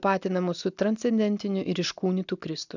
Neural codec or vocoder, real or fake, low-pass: none; real; 7.2 kHz